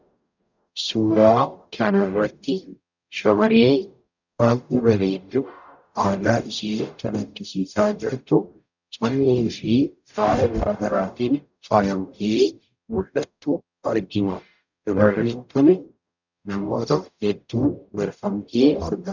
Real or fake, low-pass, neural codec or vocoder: fake; 7.2 kHz; codec, 44.1 kHz, 0.9 kbps, DAC